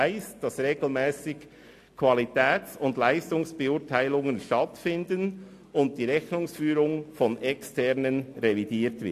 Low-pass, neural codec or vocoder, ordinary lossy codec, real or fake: 14.4 kHz; none; AAC, 64 kbps; real